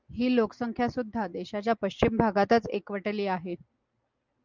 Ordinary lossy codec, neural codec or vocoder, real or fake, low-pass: Opus, 24 kbps; none; real; 7.2 kHz